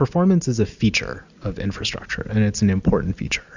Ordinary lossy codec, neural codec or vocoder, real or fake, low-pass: Opus, 64 kbps; none; real; 7.2 kHz